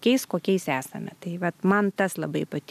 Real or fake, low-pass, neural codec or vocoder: real; 14.4 kHz; none